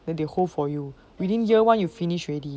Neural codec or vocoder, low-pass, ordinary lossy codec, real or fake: none; none; none; real